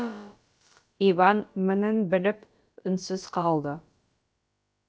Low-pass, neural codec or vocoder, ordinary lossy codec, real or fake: none; codec, 16 kHz, about 1 kbps, DyCAST, with the encoder's durations; none; fake